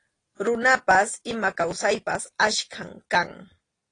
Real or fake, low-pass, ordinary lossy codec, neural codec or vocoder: real; 9.9 kHz; AAC, 32 kbps; none